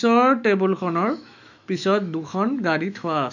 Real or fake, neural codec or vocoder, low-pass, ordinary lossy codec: fake; autoencoder, 48 kHz, 128 numbers a frame, DAC-VAE, trained on Japanese speech; 7.2 kHz; none